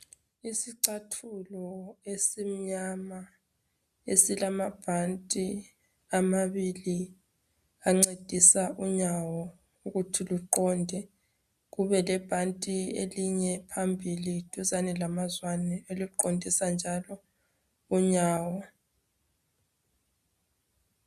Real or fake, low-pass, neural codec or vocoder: real; 14.4 kHz; none